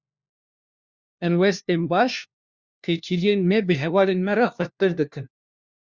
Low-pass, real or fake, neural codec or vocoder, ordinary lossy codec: 7.2 kHz; fake; codec, 16 kHz, 1 kbps, FunCodec, trained on LibriTTS, 50 frames a second; Opus, 64 kbps